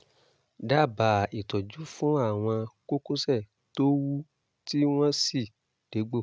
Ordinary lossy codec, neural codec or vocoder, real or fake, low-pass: none; none; real; none